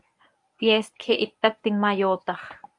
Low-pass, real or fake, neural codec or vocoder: 10.8 kHz; fake; codec, 24 kHz, 0.9 kbps, WavTokenizer, medium speech release version 1